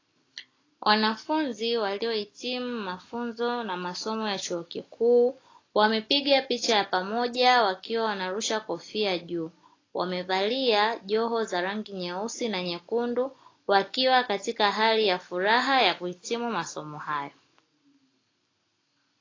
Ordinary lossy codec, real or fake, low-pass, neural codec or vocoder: AAC, 32 kbps; real; 7.2 kHz; none